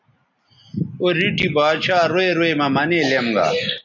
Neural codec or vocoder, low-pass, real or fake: none; 7.2 kHz; real